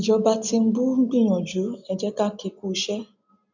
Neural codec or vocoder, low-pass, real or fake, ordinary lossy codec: none; 7.2 kHz; real; none